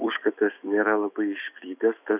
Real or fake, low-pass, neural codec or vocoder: fake; 3.6 kHz; autoencoder, 48 kHz, 128 numbers a frame, DAC-VAE, trained on Japanese speech